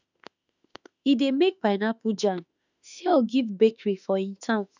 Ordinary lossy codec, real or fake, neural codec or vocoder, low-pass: none; fake; autoencoder, 48 kHz, 32 numbers a frame, DAC-VAE, trained on Japanese speech; 7.2 kHz